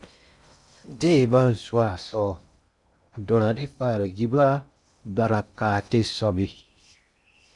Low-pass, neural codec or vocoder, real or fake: 10.8 kHz; codec, 16 kHz in and 24 kHz out, 0.6 kbps, FocalCodec, streaming, 4096 codes; fake